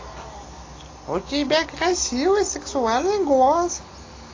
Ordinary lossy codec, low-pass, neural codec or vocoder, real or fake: AAC, 32 kbps; 7.2 kHz; none; real